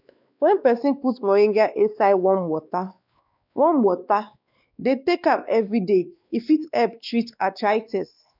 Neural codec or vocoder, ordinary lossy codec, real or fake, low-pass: codec, 16 kHz, 2 kbps, X-Codec, WavLM features, trained on Multilingual LibriSpeech; none; fake; 5.4 kHz